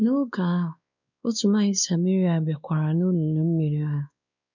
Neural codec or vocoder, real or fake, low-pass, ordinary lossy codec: codec, 16 kHz, 2 kbps, X-Codec, WavLM features, trained on Multilingual LibriSpeech; fake; 7.2 kHz; none